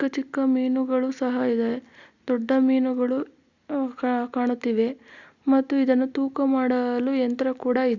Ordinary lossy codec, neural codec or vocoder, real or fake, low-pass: Opus, 64 kbps; none; real; 7.2 kHz